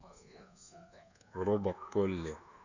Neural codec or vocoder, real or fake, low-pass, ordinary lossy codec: codec, 24 kHz, 1.2 kbps, DualCodec; fake; 7.2 kHz; none